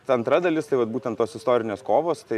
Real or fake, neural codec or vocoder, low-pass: real; none; 14.4 kHz